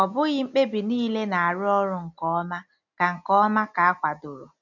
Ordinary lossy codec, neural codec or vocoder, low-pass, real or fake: none; none; 7.2 kHz; real